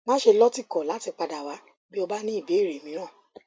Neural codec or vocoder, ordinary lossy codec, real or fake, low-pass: none; none; real; none